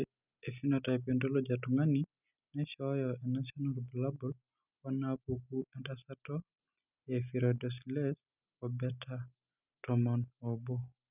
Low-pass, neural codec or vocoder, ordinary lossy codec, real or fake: 3.6 kHz; none; none; real